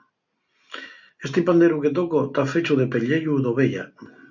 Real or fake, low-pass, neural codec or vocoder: real; 7.2 kHz; none